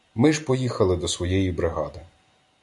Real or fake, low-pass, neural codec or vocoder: real; 10.8 kHz; none